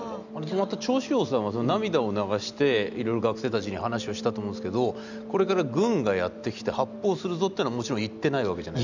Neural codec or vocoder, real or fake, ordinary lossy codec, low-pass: none; real; none; 7.2 kHz